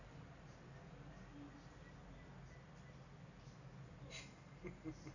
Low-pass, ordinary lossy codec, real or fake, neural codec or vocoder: 7.2 kHz; none; real; none